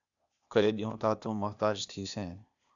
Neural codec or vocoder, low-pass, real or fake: codec, 16 kHz, 0.8 kbps, ZipCodec; 7.2 kHz; fake